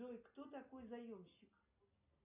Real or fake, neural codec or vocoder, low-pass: real; none; 3.6 kHz